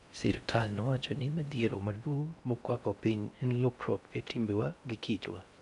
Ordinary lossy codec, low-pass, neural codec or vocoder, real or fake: none; 10.8 kHz; codec, 16 kHz in and 24 kHz out, 0.6 kbps, FocalCodec, streaming, 4096 codes; fake